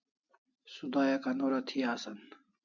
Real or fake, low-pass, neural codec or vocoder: real; 7.2 kHz; none